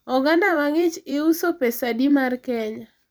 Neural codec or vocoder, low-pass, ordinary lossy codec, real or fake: vocoder, 44.1 kHz, 128 mel bands every 256 samples, BigVGAN v2; none; none; fake